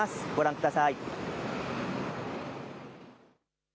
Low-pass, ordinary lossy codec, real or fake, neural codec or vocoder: none; none; real; none